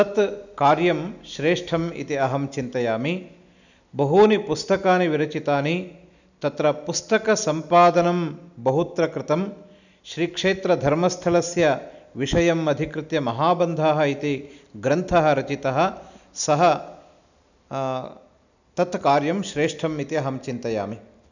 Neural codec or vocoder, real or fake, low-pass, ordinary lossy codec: none; real; 7.2 kHz; none